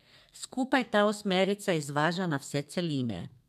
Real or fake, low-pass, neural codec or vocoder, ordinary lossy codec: fake; 14.4 kHz; codec, 32 kHz, 1.9 kbps, SNAC; none